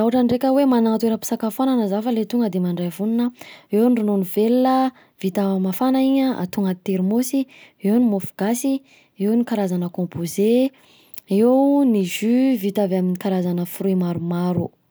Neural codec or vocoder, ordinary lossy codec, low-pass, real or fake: none; none; none; real